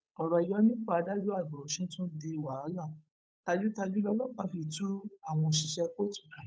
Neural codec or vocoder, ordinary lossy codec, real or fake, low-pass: codec, 16 kHz, 8 kbps, FunCodec, trained on Chinese and English, 25 frames a second; none; fake; none